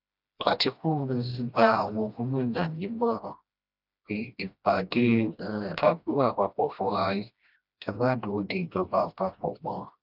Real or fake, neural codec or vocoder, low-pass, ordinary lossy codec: fake; codec, 16 kHz, 1 kbps, FreqCodec, smaller model; 5.4 kHz; none